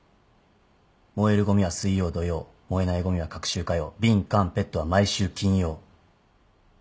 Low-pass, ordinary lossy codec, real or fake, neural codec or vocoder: none; none; real; none